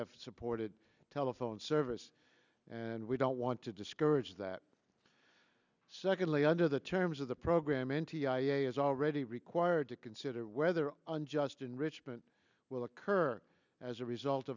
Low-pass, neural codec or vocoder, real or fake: 7.2 kHz; none; real